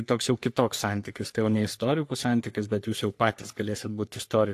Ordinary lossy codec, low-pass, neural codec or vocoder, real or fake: AAC, 64 kbps; 14.4 kHz; codec, 44.1 kHz, 3.4 kbps, Pupu-Codec; fake